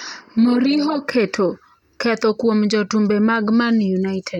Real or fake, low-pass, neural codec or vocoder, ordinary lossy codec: real; 19.8 kHz; none; none